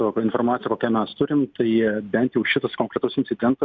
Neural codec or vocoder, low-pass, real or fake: none; 7.2 kHz; real